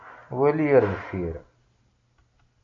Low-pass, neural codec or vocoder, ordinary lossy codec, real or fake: 7.2 kHz; none; AAC, 48 kbps; real